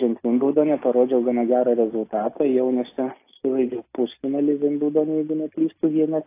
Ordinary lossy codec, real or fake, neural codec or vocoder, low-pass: AAC, 24 kbps; real; none; 3.6 kHz